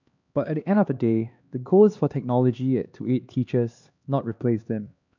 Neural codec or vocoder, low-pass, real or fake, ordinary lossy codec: codec, 16 kHz, 2 kbps, X-Codec, HuBERT features, trained on LibriSpeech; 7.2 kHz; fake; none